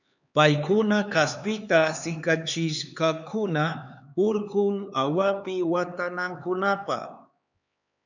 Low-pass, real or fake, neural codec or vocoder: 7.2 kHz; fake; codec, 16 kHz, 4 kbps, X-Codec, HuBERT features, trained on LibriSpeech